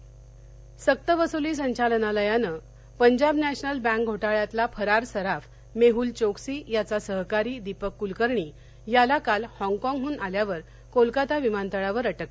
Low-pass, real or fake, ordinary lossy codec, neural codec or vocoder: none; real; none; none